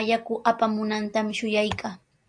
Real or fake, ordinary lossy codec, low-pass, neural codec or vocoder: real; AAC, 64 kbps; 9.9 kHz; none